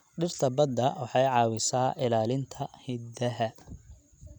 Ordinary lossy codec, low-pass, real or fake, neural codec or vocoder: none; 19.8 kHz; real; none